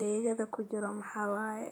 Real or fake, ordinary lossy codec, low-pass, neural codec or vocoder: fake; none; none; vocoder, 44.1 kHz, 128 mel bands, Pupu-Vocoder